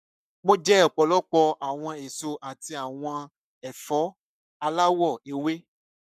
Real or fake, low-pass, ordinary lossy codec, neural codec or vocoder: fake; 14.4 kHz; none; codec, 44.1 kHz, 7.8 kbps, Pupu-Codec